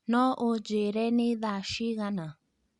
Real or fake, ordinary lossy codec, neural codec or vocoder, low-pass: real; Opus, 64 kbps; none; 10.8 kHz